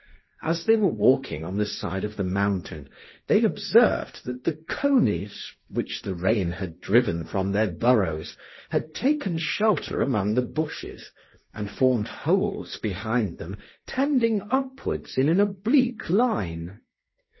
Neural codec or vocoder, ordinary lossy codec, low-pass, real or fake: codec, 16 kHz, 1.1 kbps, Voila-Tokenizer; MP3, 24 kbps; 7.2 kHz; fake